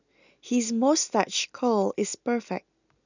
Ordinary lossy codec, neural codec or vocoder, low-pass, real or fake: none; none; 7.2 kHz; real